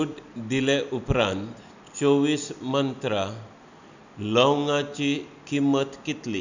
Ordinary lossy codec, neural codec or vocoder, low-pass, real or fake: none; none; 7.2 kHz; real